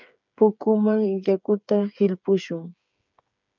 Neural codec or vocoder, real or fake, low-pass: codec, 16 kHz, 8 kbps, FreqCodec, smaller model; fake; 7.2 kHz